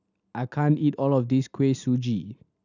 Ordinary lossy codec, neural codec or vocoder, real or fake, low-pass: none; none; real; 7.2 kHz